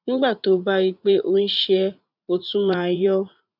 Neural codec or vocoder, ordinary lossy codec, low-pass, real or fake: vocoder, 44.1 kHz, 80 mel bands, Vocos; none; 5.4 kHz; fake